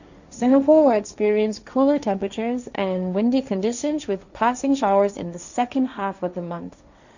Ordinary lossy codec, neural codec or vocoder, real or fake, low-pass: none; codec, 16 kHz, 1.1 kbps, Voila-Tokenizer; fake; 7.2 kHz